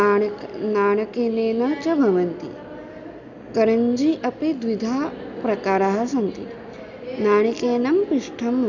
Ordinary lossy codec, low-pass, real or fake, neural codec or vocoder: none; 7.2 kHz; real; none